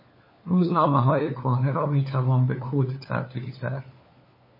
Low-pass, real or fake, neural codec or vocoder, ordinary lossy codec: 5.4 kHz; fake; codec, 16 kHz, 4 kbps, FunCodec, trained on LibriTTS, 50 frames a second; MP3, 24 kbps